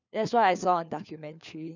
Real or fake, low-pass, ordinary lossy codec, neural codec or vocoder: fake; 7.2 kHz; none; codec, 16 kHz, 4 kbps, FunCodec, trained on LibriTTS, 50 frames a second